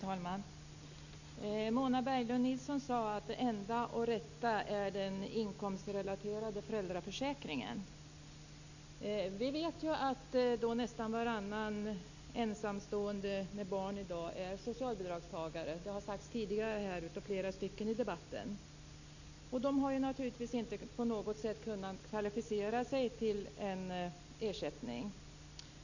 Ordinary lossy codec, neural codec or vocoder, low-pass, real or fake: none; none; 7.2 kHz; real